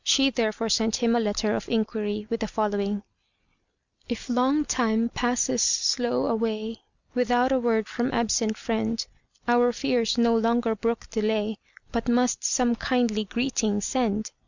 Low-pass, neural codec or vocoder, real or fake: 7.2 kHz; none; real